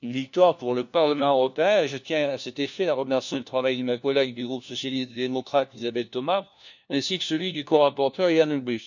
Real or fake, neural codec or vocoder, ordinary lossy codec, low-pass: fake; codec, 16 kHz, 1 kbps, FunCodec, trained on LibriTTS, 50 frames a second; none; 7.2 kHz